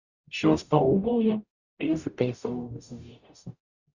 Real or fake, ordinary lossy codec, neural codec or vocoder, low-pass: fake; Opus, 64 kbps; codec, 44.1 kHz, 0.9 kbps, DAC; 7.2 kHz